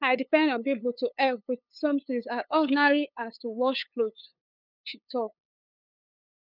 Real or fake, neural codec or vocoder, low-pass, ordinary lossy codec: fake; codec, 16 kHz, 8 kbps, FunCodec, trained on LibriTTS, 25 frames a second; 5.4 kHz; none